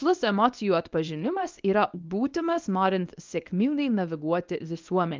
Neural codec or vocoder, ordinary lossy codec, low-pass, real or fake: codec, 24 kHz, 0.9 kbps, WavTokenizer, medium speech release version 1; Opus, 24 kbps; 7.2 kHz; fake